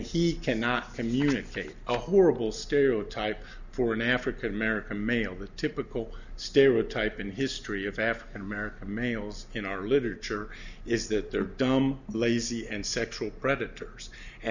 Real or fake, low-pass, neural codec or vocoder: real; 7.2 kHz; none